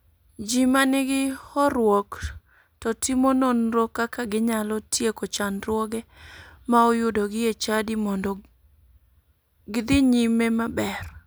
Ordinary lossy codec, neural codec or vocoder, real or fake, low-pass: none; none; real; none